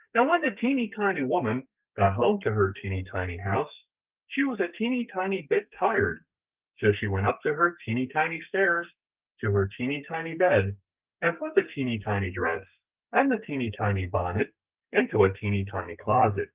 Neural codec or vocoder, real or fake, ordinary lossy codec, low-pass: codec, 32 kHz, 1.9 kbps, SNAC; fake; Opus, 32 kbps; 3.6 kHz